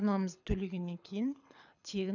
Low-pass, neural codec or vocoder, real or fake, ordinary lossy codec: 7.2 kHz; codec, 24 kHz, 6 kbps, HILCodec; fake; none